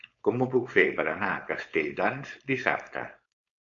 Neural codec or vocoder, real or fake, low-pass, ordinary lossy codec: codec, 16 kHz, 4.8 kbps, FACodec; fake; 7.2 kHz; AAC, 64 kbps